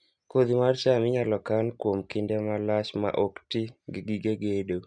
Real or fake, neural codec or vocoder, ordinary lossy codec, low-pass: real; none; none; 9.9 kHz